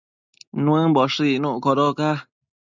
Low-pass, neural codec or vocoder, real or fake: 7.2 kHz; none; real